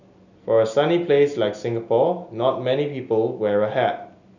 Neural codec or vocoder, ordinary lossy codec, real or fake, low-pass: none; none; real; 7.2 kHz